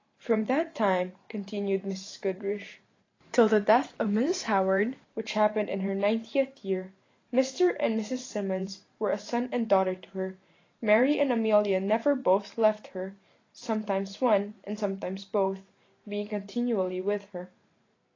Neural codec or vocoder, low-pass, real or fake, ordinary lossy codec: vocoder, 44.1 kHz, 128 mel bands every 512 samples, BigVGAN v2; 7.2 kHz; fake; AAC, 32 kbps